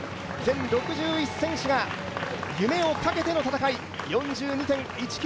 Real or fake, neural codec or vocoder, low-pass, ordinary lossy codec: real; none; none; none